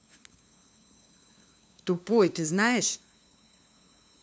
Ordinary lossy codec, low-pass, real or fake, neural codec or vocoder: none; none; fake; codec, 16 kHz, 2 kbps, FunCodec, trained on LibriTTS, 25 frames a second